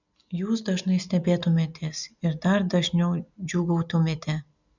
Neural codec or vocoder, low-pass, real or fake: none; 7.2 kHz; real